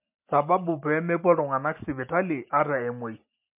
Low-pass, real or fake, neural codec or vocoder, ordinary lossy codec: 3.6 kHz; real; none; MP3, 32 kbps